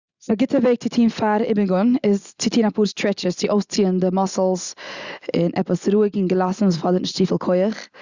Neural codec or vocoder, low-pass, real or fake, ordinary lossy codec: none; 7.2 kHz; real; Opus, 64 kbps